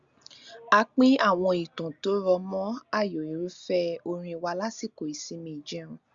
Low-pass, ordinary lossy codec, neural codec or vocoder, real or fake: 7.2 kHz; Opus, 64 kbps; none; real